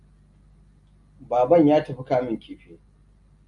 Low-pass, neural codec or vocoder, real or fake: 10.8 kHz; none; real